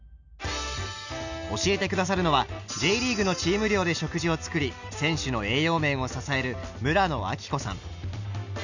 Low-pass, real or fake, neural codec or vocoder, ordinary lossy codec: 7.2 kHz; real; none; none